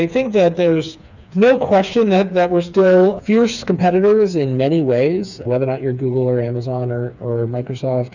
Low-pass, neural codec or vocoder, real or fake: 7.2 kHz; codec, 16 kHz, 4 kbps, FreqCodec, smaller model; fake